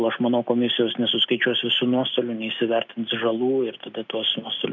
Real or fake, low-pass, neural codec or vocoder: real; 7.2 kHz; none